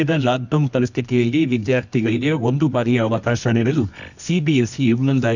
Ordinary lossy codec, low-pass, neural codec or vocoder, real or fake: none; 7.2 kHz; codec, 24 kHz, 0.9 kbps, WavTokenizer, medium music audio release; fake